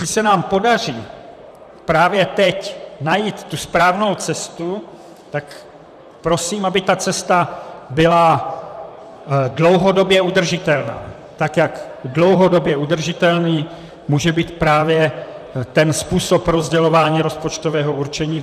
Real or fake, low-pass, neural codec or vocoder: fake; 14.4 kHz; vocoder, 44.1 kHz, 128 mel bands, Pupu-Vocoder